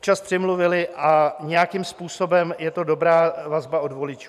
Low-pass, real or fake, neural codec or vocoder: 14.4 kHz; fake; vocoder, 44.1 kHz, 128 mel bands every 512 samples, BigVGAN v2